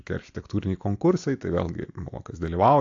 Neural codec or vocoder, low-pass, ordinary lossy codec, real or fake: none; 7.2 kHz; AAC, 48 kbps; real